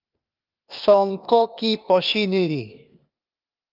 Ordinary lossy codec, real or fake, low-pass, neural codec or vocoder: Opus, 24 kbps; fake; 5.4 kHz; codec, 16 kHz, 0.8 kbps, ZipCodec